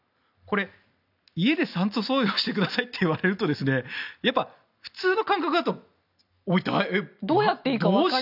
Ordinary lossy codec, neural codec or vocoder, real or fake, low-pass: none; none; real; 5.4 kHz